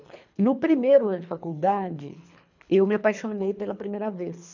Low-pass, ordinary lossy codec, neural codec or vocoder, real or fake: 7.2 kHz; none; codec, 24 kHz, 3 kbps, HILCodec; fake